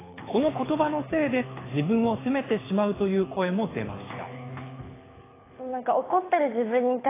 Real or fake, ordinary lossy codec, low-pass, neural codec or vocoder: fake; AAC, 16 kbps; 3.6 kHz; codec, 24 kHz, 6 kbps, HILCodec